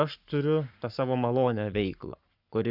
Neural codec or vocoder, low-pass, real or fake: codec, 44.1 kHz, 7.8 kbps, Pupu-Codec; 5.4 kHz; fake